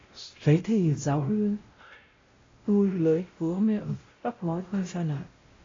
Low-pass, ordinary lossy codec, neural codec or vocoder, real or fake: 7.2 kHz; AAC, 32 kbps; codec, 16 kHz, 0.5 kbps, X-Codec, WavLM features, trained on Multilingual LibriSpeech; fake